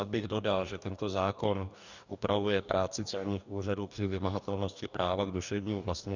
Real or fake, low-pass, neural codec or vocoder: fake; 7.2 kHz; codec, 44.1 kHz, 2.6 kbps, DAC